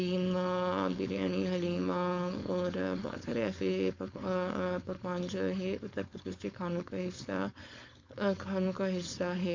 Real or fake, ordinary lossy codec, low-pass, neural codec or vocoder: fake; MP3, 64 kbps; 7.2 kHz; codec, 16 kHz, 4.8 kbps, FACodec